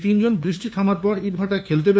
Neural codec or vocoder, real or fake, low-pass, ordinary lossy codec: codec, 16 kHz, 2 kbps, FunCodec, trained on LibriTTS, 25 frames a second; fake; none; none